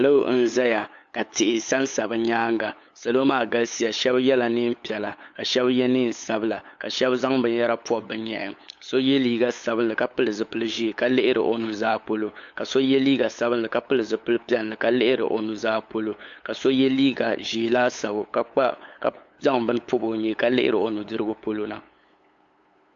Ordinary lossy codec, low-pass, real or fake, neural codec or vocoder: AAC, 64 kbps; 7.2 kHz; fake; codec, 16 kHz, 8 kbps, FunCodec, trained on LibriTTS, 25 frames a second